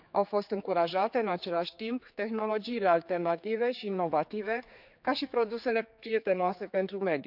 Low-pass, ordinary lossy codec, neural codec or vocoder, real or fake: 5.4 kHz; none; codec, 16 kHz, 4 kbps, X-Codec, HuBERT features, trained on general audio; fake